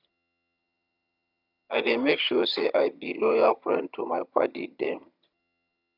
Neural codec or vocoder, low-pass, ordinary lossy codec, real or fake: vocoder, 22.05 kHz, 80 mel bands, HiFi-GAN; 5.4 kHz; none; fake